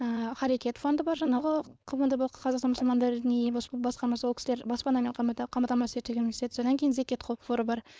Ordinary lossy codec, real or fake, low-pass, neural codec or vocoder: none; fake; none; codec, 16 kHz, 4.8 kbps, FACodec